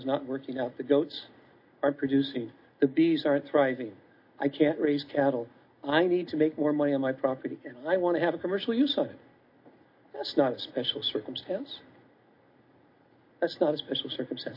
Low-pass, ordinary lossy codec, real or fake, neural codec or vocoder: 5.4 kHz; MP3, 32 kbps; real; none